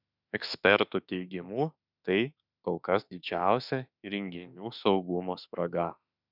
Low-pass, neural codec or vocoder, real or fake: 5.4 kHz; autoencoder, 48 kHz, 32 numbers a frame, DAC-VAE, trained on Japanese speech; fake